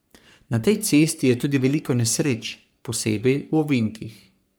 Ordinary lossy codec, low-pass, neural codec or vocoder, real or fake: none; none; codec, 44.1 kHz, 3.4 kbps, Pupu-Codec; fake